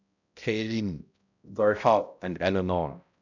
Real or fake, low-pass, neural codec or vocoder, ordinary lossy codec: fake; 7.2 kHz; codec, 16 kHz, 0.5 kbps, X-Codec, HuBERT features, trained on balanced general audio; none